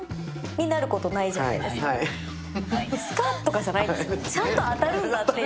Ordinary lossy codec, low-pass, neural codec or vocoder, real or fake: none; none; none; real